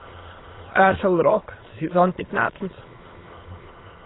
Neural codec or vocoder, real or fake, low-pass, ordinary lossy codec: autoencoder, 22.05 kHz, a latent of 192 numbers a frame, VITS, trained on many speakers; fake; 7.2 kHz; AAC, 16 kbps